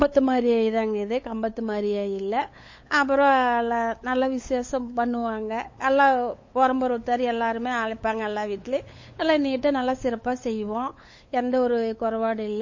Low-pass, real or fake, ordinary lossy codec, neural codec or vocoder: 7.2 kHz; fake; MP3, 32 kbps; codec, 16 kHz, 8 kbps, FunCodec, trained on LibriTTS, 25 frames a second